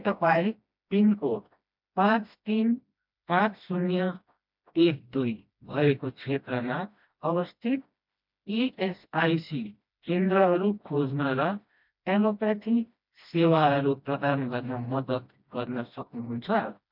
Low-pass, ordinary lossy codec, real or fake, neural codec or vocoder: 5.4 kHz; MP3, 48 kbps; fake; codec, 16 kHz, 1 kbps, FreqCodec, smaller model